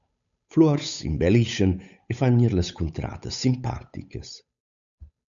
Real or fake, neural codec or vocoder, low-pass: fake; codec, 16 kHz, 8 kbps, FunCodec, trained on Chinese and English, 25 frames a second; 7.2 kHz